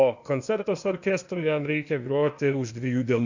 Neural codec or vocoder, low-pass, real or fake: codec, 16 kHz, 0.8 kbps, ZipCodec; 7.2 kHz; fake